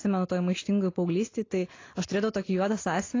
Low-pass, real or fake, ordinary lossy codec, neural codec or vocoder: 7.2 kHz; real; AAC, 32 kbps; none